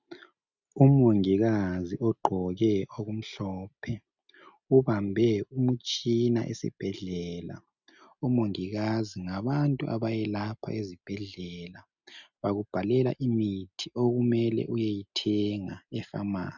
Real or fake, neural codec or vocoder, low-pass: real; none; 7.2 kHz